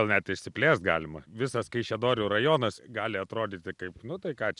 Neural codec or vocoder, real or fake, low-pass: none; real; 10.8 kHz